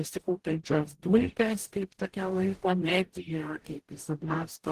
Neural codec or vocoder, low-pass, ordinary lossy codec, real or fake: codec, 44.1 kHz, 0.9 kbps, DAC; 14.4 kHz; Opus, 16 kbps; fake